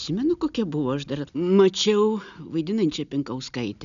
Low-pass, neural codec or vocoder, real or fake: 7.2 kHz; none; real